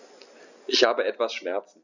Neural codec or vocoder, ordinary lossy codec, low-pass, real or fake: none; none; 7.2 kHz; real